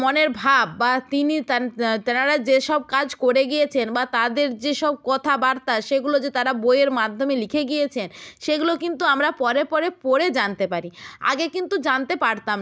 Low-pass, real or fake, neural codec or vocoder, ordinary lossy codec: none; real; none; none